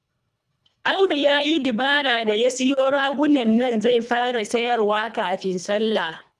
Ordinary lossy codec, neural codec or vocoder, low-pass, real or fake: none; codec, 24 kHz, 1.5 kbps, HILCodec; none; fake